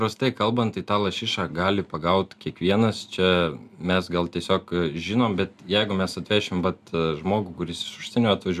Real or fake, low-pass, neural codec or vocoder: real; 14.4 kHz; none